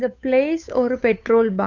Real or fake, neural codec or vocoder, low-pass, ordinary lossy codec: fake; codec, 16 kHz, 8 kbps, FunCodec, trained on Chinese and English, 25 frames a second; 7.2 kHz; none